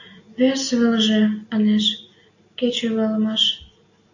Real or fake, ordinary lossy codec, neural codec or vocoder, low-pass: real; AAC, 48 kbps; none; 7.2 kHz